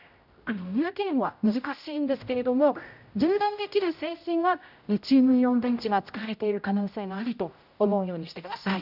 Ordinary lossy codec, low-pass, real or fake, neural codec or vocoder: none; 5.4 kHz; fake; codec, 16 kHz, 0.5 kbps, X-Codec, HuBERT features, trained on general audio